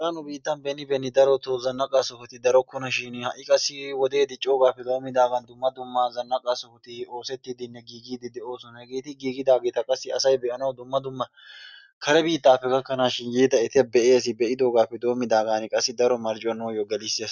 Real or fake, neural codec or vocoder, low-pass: real; none; 7.2 kHz